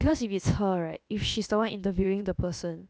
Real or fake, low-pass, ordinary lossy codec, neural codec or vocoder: fake; none; none; codec, 16 kHz, about 1 kbps, DyCAST, with the encoder's durations